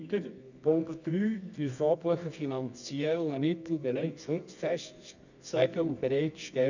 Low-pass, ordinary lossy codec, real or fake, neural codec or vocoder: 7.2 kHz; none; fake; codec, 24 kHz, 0.9 kbps, WavTokenizer, medium music audio release